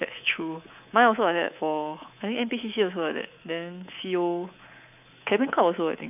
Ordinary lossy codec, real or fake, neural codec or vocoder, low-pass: none; fake; codec, 24 kHz, 3.1 kbps, DualCodec; 3.6 kHz